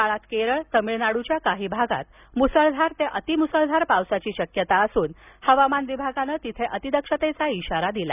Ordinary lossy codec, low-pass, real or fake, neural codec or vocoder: none; 3.6 kHz; real; none